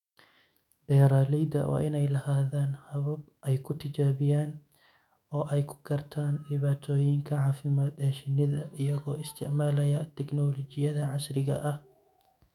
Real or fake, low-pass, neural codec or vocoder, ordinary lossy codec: fake; 19.8 kHz; autoencoder, 48 kHz, 128 numbers a frame, DAC-VAE, trained on Japanese speech; none